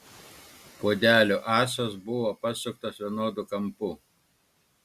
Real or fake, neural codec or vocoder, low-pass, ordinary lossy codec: real; none; 14.4 kHz; Opus, 64 kbps